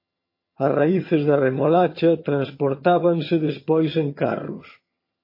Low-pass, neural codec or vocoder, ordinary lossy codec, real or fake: 5.4 kHz; vocoder, 22.05 kHz, 80 mel bands, HiFi-GAN; MP3, 24 kbps; fake